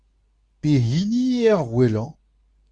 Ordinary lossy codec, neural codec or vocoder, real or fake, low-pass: Opus, 64 kbps; codec, 24 kHz, 0.9 kbps, WavTokenizer, medium speech release version 2; fake; 9.9 kHz